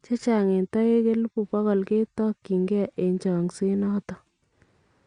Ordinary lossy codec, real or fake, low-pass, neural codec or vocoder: Opus, 64 kbps; real; 9.9 kHz; none